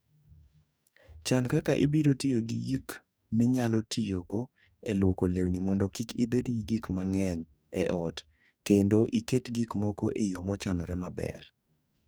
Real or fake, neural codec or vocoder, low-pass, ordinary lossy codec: fake; codec, 44.1 kHz, 2.6 kbps, DAC; none; none